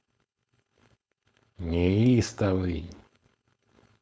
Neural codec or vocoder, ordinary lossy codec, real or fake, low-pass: codec, 16 kHz, 4.8 kbps, FACodec; none; fake; none